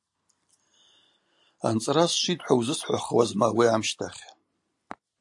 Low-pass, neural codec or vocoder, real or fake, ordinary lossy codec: 10.8 kHz; none; real; AAC, 64 kbps